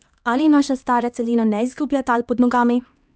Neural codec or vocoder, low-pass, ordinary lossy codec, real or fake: codec, 16 kHz, 2 kbps, X-Codec, HuBERT features, trained on LibriSpeech; none; none; fake